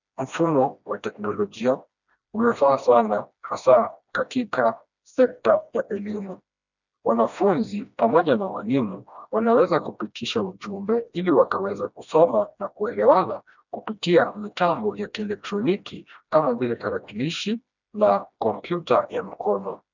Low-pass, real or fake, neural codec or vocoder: 7.2 kHz; fake; codec, 16 kHz, 1 kbps, FreqCodec, smaller model